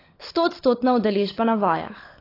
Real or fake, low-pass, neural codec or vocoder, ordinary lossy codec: fake; 5.4 kHz; codec, 16 kHz, 16 kbps, FunCodec, trained on LibriTTS, 50 frames a second; AAC, 24 kbps